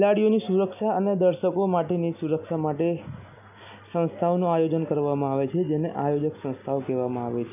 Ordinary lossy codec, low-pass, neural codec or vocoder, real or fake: none; 3.6 kHz; none; real